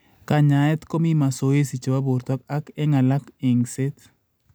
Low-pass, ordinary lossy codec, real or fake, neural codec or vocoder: none; none; real; none